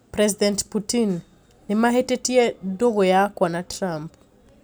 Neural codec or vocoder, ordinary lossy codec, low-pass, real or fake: none; none; none; real